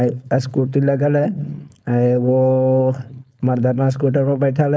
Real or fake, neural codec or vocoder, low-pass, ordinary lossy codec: fake; codec, 16 kHz, 4.8 kbps, FACodec; none; none